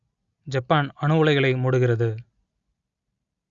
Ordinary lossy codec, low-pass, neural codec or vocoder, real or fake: Opus, 64 kbps; 7.2 kHz; none; real